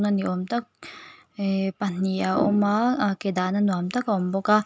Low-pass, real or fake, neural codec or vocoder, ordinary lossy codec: none; real; none; none